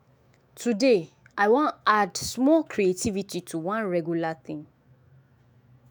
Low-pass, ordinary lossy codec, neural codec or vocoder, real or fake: none; none; autoencoder, 48 kHz, 128 numbers a frame, DAC-VAE, trained on Japanese speech; fake